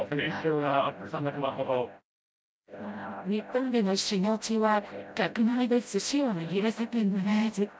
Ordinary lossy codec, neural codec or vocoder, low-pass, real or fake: none; codec, 16 kHz, 0.5 kbps, FreqCodec, smaller model; none; fake